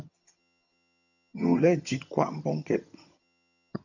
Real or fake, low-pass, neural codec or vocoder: fake; 7.2 kHz; vocoder, 22.05 kHz, 80 mel bands, HiFi-GAN